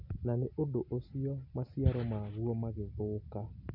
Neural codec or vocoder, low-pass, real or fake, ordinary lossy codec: none; 5.4 kHz; real; none